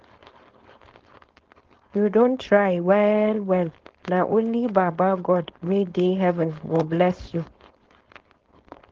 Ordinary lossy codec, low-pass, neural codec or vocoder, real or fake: Opus, 16 kbps; 7.2 kHz; codec, 16 kHz, 4.8 kbps, FACodec; fake